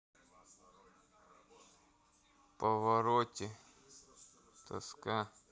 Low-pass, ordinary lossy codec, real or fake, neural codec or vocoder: none; none; real; none